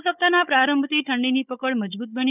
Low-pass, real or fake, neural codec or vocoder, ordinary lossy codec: 3.6 kHz; fake; codec, 16 kHz, 16 kbps, FunCodec, trained on Chinese and English, 50 frames a second; none